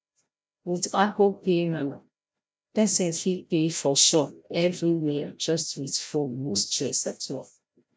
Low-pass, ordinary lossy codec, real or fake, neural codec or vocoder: none; none; fake; codec, 16 kHz, 0.5 kbps, FreqCodec, larger model